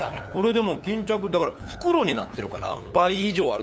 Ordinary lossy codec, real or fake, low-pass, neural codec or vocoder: none; fake; none; codec, 16 kHz, 8 kbps, FunCodec, trained on LibriTTS, 25 frames a second